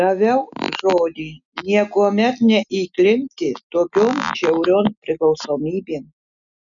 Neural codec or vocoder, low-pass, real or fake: none; 7.2 kHz; real